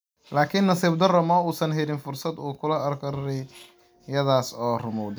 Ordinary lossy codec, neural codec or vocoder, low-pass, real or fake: none; none; none; real